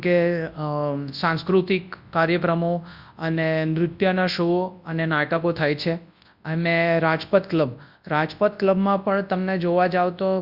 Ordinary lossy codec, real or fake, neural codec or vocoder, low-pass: Opus, 64 kbps; fake; codec, 24 kHz, 0.9 kbps, WavTokenizer, large speech release; 5.4 kHz